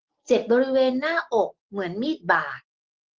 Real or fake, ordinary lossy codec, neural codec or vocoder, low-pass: real; Opus, 16 kbps; none; 7.2 kHz